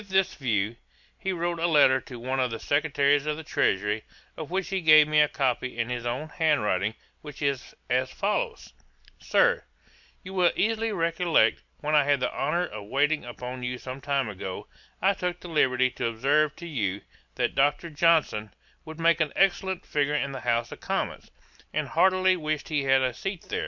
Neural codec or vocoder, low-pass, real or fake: none; 7.2 kHz; real